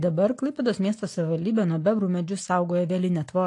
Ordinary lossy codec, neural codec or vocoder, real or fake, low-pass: AAC, 48 kbps; none; real; 10.8 kHz